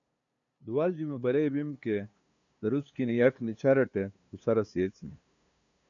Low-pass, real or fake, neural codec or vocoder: 7.2 kHz; fake; codec, 16 kHz, 2 kbps, FunCodec, trained on LibriTTS, 25 frames a second